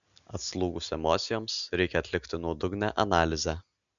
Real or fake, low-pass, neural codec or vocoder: real; 7.2 kHz; none